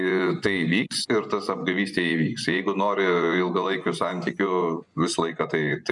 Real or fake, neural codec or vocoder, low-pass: fake; vocoder, 24 kHz, 100 mel bands, Vocos; 10.8 kHz